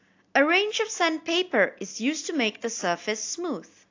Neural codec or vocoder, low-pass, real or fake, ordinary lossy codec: none; 7.2 kHz; real; AAC, 48 kbps